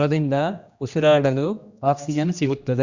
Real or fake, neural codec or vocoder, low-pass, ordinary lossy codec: fake; codec, 16 kHz, 1 kbps, X-Codec, HuBERT features, trained on general audio; 7.2 kHz; Opus, 64 kbps